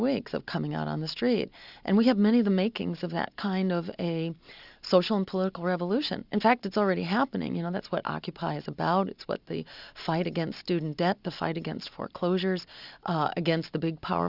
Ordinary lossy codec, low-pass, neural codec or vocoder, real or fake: Opus, 64 kbps; 5.4 kHz; none; real